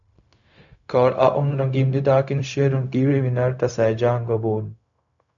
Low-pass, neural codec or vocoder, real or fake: 7.2 kHz; codec, 16 kHz, 0.4 kbps, LongCat-Audio-Codec; fake